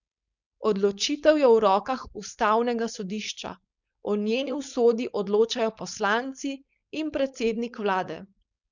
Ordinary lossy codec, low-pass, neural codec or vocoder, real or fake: none; 7.2 kHz; codec, 16 kHz, 4.8 kbps, FACodec; fake